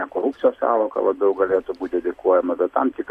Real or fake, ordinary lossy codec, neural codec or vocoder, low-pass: real; AAC, 48 kbps; none; 14.4 kHz